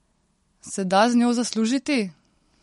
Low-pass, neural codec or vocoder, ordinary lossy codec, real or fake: 19.8 kHz; none; MP3, 48 kbps; real